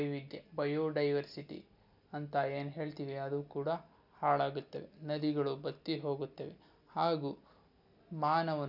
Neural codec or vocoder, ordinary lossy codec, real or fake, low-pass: none; none; real; 5.4 kHz